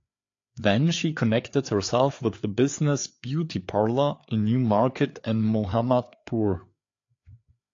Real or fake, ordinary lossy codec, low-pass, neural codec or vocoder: fake; AAC, 48 kbps; 7.2 kHz; codec, 16 kHz, 4 kbps, FreqCodec, larger model